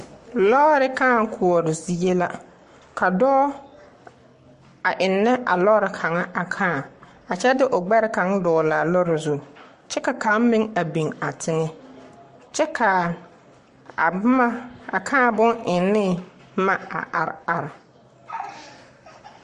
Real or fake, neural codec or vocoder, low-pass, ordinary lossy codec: fake; codec, 44.1 kHz, 7.8 kbps, Pupu-Codec; 14.4 kHz; MP3, 48 kbps